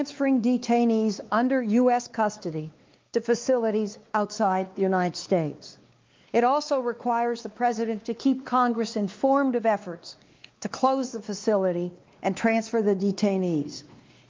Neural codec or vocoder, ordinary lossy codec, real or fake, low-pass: codec, 16 kHz, 2 kbps, X-Codec, WavLM features, trained on Multilingual LibriSpeech; Opus, 24 kbps; fake; 7.2 kHz